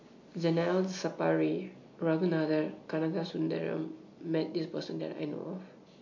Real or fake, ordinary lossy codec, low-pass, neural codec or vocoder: real; MP3, 48 kbps; 7.2 kHz; none